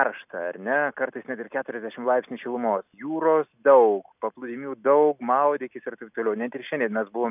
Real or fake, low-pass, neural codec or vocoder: real; 3.6 kHz; none